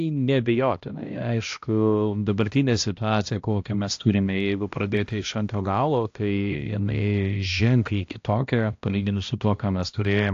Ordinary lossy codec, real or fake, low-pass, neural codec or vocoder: AAC, 48 kbps; fake; 7.2 kHz; codec, 16 kHz, 1 kbps, X-Codec, HuBERT features, trained on balanced general audio